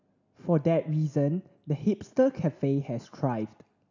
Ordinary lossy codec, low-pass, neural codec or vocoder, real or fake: none; 7.2 kHz; none; real